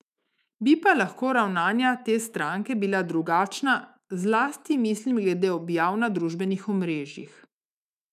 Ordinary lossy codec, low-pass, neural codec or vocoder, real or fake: none; 14.4 kHz; autoencoder, 48 kHz, 128 numbers a frame, DAC-VAE, trained on Japanese speech; fake